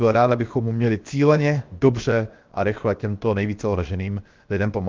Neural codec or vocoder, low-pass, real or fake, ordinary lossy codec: codec, 16 kHz, 0.7 kbps, FocalCodec; 7.2 kHz; fake; Opus, 24 kbps